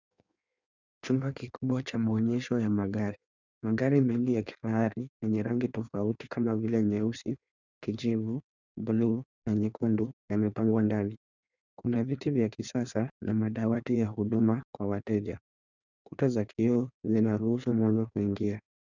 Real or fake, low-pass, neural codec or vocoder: fake; 7.2 kHz; codec, 16 kHz in and 24 kHz out, 1.1 kbps, FireRedTTS-2 codec